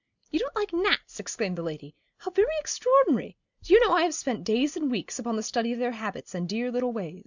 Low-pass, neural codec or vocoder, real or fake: 7.2 kHz; none; real